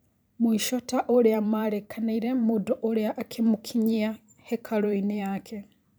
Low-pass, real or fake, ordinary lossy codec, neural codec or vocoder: none; fake; none; vocoder, 44.1 kHz, 128 mel bands every 512 samples, BigVGAN v2